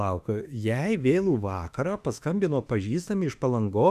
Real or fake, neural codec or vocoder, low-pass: fake; autoencoder, 48 kHz, 32 numbers a frame, DAC-VAE, trained on Japanese speech; 14.4 kHz